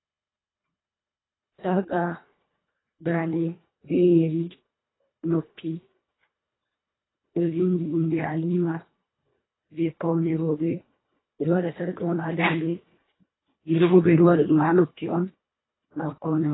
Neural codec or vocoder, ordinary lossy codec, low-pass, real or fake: codec, 24 kHz, 1.5 kbps, HILCodec; AAC, 16 kbps; 7.2 kHz; fake